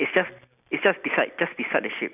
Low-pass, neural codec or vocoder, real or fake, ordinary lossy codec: 3.6 kHz; none; real; none